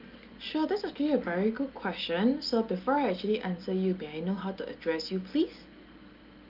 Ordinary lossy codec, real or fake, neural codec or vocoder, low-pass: Opus, 24 kbps; real; none; 5.4 kHz